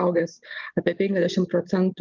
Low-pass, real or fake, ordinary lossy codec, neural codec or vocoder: 7.2 kHz; real; Opus, 32 kbps; none